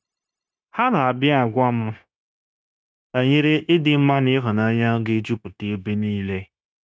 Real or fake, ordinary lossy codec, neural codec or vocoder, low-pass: fake; none; codec, 16 kHz, 0.9 kbps, LongCat-Audio-Codec; none